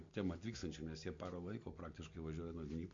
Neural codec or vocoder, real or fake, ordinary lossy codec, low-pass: none; real; MP3, 48 kbps; 7.2 kHz